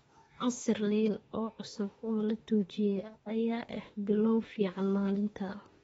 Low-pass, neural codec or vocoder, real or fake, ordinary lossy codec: 19.8 kHz; autoencoder, 48 kHz, 32 numbers a frame, DAC-VAE, trained on Japanese speech; fake; AAC, 24 kbps